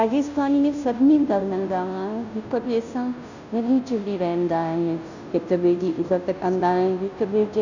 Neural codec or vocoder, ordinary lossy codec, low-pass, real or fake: codec, 16 kHz, 0.5 kbps, FunCodec, trained on Chinese and English, 25 frames a second; none; 7.2 kHz; fake